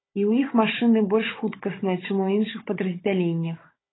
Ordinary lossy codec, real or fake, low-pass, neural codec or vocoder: AAC, 16 kbps; fake; 7.2 kHz; codec, 16 kHz, 16 kbps, FunCodec, trained on Chinese and English, 50 frames a second